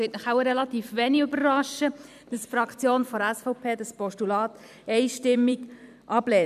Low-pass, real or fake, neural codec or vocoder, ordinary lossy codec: 14.4 kHz; fake; vocoder, 44.1 kHz, 128 mel bands every 256 samples, BigVGAN v2; none